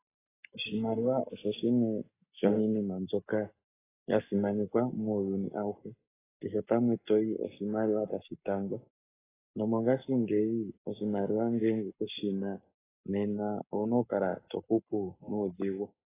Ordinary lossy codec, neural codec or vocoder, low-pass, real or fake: AAC, 16 kbps; codec, 44.1 kHz, 7.8 kbps, Pupu-Codec; 3.6 kHz; fake